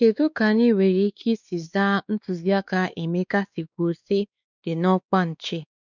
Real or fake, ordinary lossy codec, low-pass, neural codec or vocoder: fake; none; 7.2 kHz; codec, 16 kHz, 2 kbps, X-Codec, WavLM features, trained on Multilingual LibriSpeech